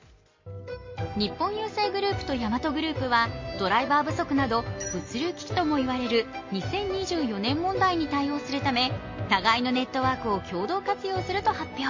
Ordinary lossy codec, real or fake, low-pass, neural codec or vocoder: MP3, 32 kbps; real; 7.2 kHz; none